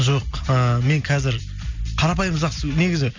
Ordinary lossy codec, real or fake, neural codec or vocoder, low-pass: MP3, 64 kbps; real; none; 7.2 kHz